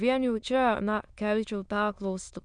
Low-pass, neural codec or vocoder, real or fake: 9.9 kHz; autoencoder, 22.05 kHz, a latent of 192 numbers a frame, VITS, trained on many speakers; fake